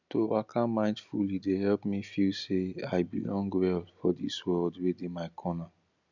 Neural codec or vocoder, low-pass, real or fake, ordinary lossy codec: none; 7.2 kHz; real; none